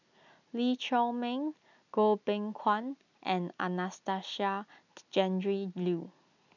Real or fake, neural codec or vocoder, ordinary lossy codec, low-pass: real; none; none; 7.2 kHz